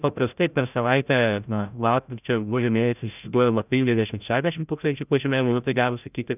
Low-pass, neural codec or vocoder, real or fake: 3.6 kHz; codec, 16 kHz, 0.5 kbps, FreqCodec, larger model; fake